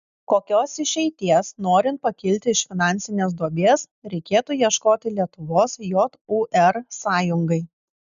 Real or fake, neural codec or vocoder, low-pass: real; none; 7.2 kHz